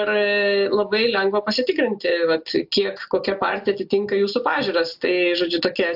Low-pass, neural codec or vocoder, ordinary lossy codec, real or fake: 5.4 kHz; none; AAC, 48 kbps; real